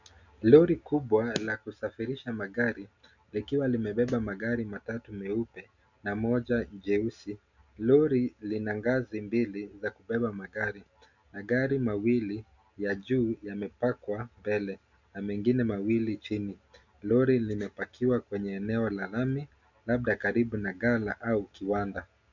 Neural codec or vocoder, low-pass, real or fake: none; 7.2 kHz; real